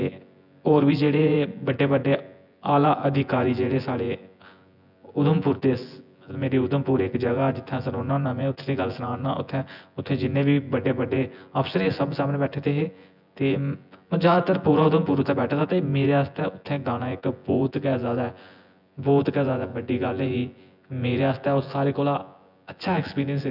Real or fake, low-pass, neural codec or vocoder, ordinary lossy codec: fake; 5.4 kHz; vocoder, 24 kHz, 100 mel bands, Vocos; none